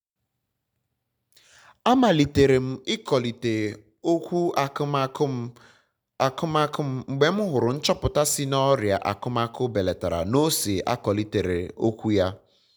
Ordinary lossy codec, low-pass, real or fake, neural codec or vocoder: none; none; real; none